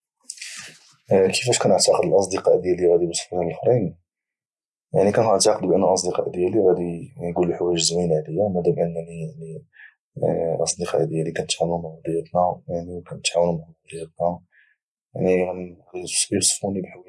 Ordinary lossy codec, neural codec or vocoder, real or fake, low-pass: none; none; real; none